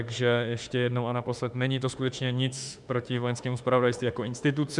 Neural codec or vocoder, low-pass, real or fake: autoencoder, 48 kHz, 32 numbers a frame, DAC-VAE, trained on Japanese speech; 10.8 kHz; fake